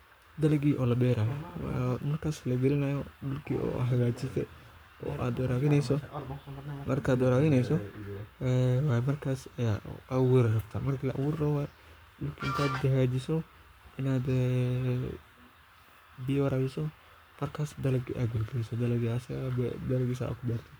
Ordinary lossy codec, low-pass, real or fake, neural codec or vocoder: none; none; fake; codec, 44.1 kHz, 7.8 kbps, Pupu-Codec